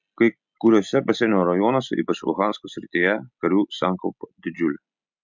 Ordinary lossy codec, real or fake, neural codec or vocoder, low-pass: MP3, 64 kbps; real; none; 7.2 kHz